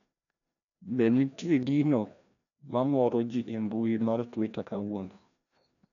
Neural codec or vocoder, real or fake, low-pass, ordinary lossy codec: codec, 16 kHz, 1 kbps, FreqCodec, larger model; fake; 7.2 kHz; none